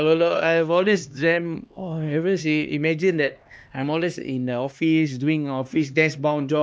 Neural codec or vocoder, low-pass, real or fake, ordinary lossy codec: codec, 16 kHz, 2 kbps, X-Codec, HuBERT features, trained on LibriSpeech; none; fake; none